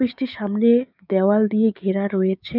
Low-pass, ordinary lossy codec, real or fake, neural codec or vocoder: 5.4 kHz; none; fake; codec, 16 kHz, 6 kbps, DAC